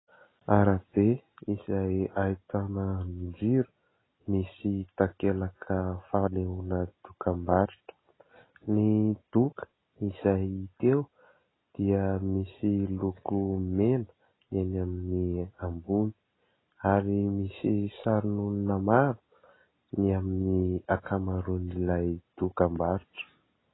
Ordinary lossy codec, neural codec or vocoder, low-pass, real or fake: AAC, 16 kbps; none; 7.2 kHz; real